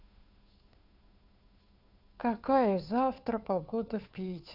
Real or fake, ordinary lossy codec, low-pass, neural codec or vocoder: fake; none; 5.4 kHz; codec, 16 kHz, 2 kbps, FunCodec, trained on Chinese and English, 25 frames a second